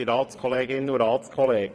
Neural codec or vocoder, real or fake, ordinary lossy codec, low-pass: vocoder, 22.05 kHz, 80 mel bands, WaveNeXt; fake; none; none